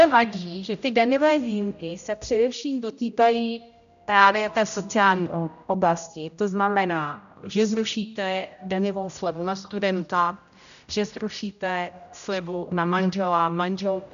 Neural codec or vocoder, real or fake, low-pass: codec, 16 kHz, 0.5 kbps, X-Codec, HuBERT features, trained on general audio; fake; 7.2 kHz